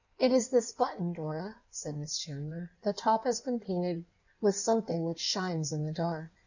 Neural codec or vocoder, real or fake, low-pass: codec, 16 kHz in and 24 kHz out, 1.1 kbps, FireRedTTS-2 codec; fake; 7.2 kHz